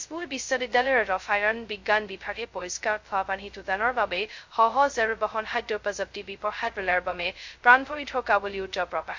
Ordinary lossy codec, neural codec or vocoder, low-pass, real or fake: MP3, 48 kbps; codec, 16 kHz, 0.2 kbps, FocalCodec; 7.2 kHz; fake